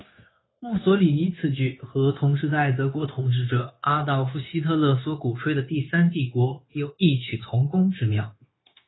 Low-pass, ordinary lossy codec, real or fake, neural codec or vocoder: 7.2 kHz; AAC, 16 kbps; fake; codec, 16 kHz, 0.9 kbps, LongCat-Audio-Codec